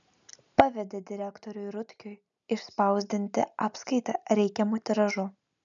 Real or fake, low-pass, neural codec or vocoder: real; 7.2 kHz; none